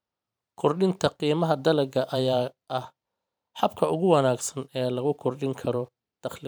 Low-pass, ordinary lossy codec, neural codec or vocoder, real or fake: none; none; vocoder, 44.1 kHz, 128 mel bands every 512 samples, BigVGAN v2; fake